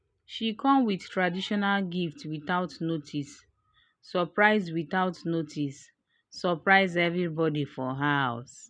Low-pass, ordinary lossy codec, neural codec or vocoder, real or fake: 9.9 kHz; none; none; real